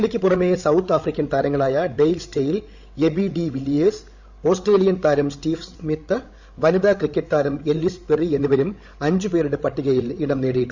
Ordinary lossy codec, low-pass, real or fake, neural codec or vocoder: Opus, 64 kbps; 7.2 kHz; fake; codec, 16 kHz, 16 kbps, FreqCodec, larger model